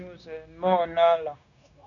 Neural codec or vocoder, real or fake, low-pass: codec, 16 kHz, 0.9 kbps, LongCat-Audio-Codec; fake; 7.2 kHz